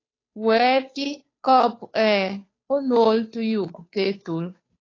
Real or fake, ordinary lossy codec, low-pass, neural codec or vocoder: fake; AAC, 32 kbps; 7.2 kHz; codec, 16 kHz, 2 kbps, FunCodec, trained on Chinese and English, 25 frames a second